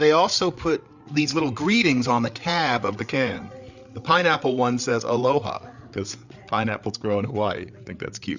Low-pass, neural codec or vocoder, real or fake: 7.2 kHz; codec, 16 kHz, 8 kbps, FreqCodec, larger model; fake